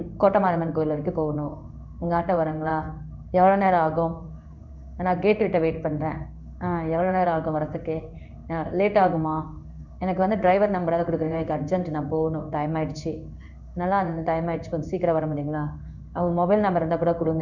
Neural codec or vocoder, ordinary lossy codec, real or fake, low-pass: codec, 16 kHz in and 24 kHz out, 1 kbps, XY-Tokenizer; none; fake; 7.2 kHz